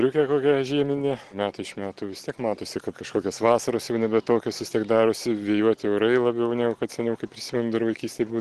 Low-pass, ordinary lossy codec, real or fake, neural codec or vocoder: 10.8 kHz; Opus, 16 kbps; real; none